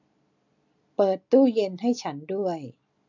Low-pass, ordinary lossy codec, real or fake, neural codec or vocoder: 7.2 kHz; none; real; none